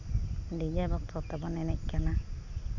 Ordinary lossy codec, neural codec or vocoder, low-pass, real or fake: none; none; 7.2 kHz; real